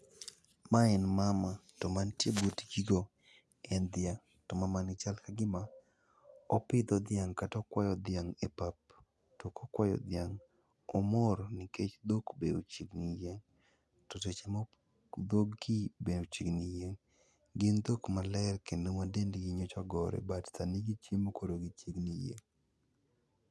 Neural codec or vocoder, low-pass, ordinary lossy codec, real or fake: none; none; none; real